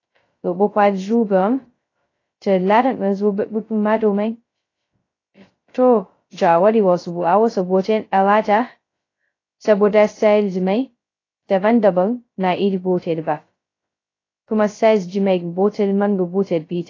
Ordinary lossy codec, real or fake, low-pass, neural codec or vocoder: AAC, 32 kbps; fake; 7.2 kHz; codec, 16 kHz, 0.2 kbps, FocalCodec